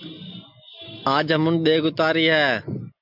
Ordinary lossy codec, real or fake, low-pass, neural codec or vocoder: MP3, 48 kbps; real; 5.4 kHz; none